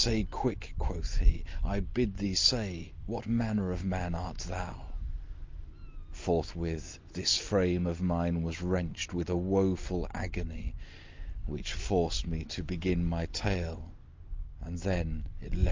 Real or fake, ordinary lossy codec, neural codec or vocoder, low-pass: real; Opus, 24 kbps; none; 7.2 kHz